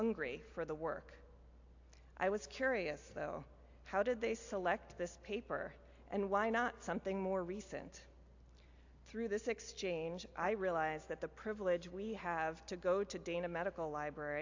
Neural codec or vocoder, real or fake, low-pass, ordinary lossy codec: none; real; 7.2 kHz; AAC, 48 kbps